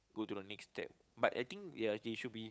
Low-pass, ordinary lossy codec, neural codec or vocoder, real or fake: none; none; codec, 16 kHz, 8 kbps, FunCodec, trained on Chinese and English, 25 frames a second; fake